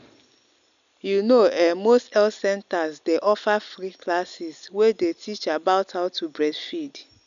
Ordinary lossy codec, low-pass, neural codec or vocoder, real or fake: MP3, 96 kbps; 7.2 kHz; none; real